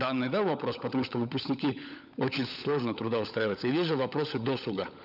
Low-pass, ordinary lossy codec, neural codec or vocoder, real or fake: 5.4 kHz; none; codec, 16 kHz, 16 kbps, FunCodec, trained on LibriTTS, 50 frames a second; fake